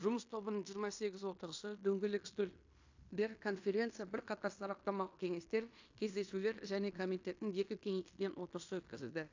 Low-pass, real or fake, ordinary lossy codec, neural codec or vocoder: 7.2 kHz; fake; AAC, 48 kbps; codec, 16 kHz in and 24 kHz out, 0.9 kbps, LongCat-Audio-Codec, fine tuned four codebook decoder